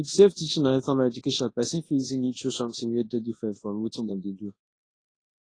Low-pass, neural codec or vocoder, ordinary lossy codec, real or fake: 9.9 kHz; codec, 24 kHz, 0.9 kbps, WavTokenizer, large speech release; AAC, 32 kbps; fake